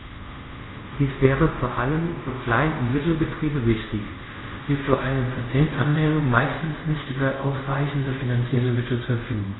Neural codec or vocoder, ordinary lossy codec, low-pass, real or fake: codec, 24 kHz, 0.5 kbps, DualCodec; AAC, 16 kbps; 7.2 kHz; fake